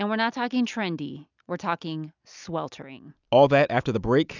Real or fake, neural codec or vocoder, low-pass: real; none; 7.2 kHz